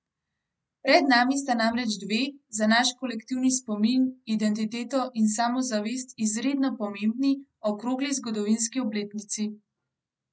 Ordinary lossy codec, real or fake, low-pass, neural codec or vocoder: none; real; none; none